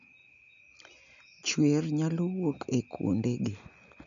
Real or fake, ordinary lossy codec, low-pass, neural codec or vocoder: real; none; 7.2 kHz; none